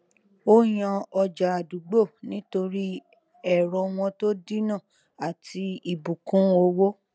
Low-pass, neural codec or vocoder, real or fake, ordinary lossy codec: none; none; real; none